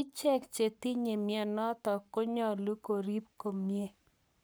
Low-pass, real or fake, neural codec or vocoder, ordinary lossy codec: none; fake; codec, 44.1 kHz, 7.8 kbps, Pupu-Codec; none